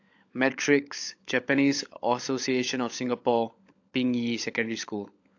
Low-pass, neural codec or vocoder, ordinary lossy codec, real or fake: 7.2 kHz; codec, 16 kHz, 16 kbps, FunCodec, trained on LibriTTS, 50 frames a second; AAC, 48 kbps; fake